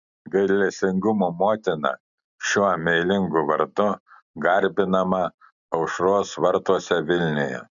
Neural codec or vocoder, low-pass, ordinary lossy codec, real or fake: none; 7.2 kHz; MP3, 96 kbps; real